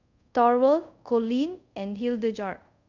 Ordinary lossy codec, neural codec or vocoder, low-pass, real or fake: none; codec, 24 kHz, 0.5 kbps, DualCodec; 7.2 kHz; fake